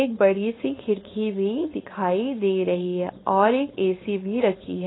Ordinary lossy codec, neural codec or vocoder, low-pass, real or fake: AAC, 16 kbps; codec, 16 kHz, 4.8 kbps, FACodec; 7.2 kHz; fake